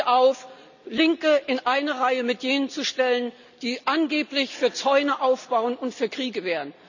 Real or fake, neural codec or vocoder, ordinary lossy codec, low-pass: real; none; none; 7.2 kHz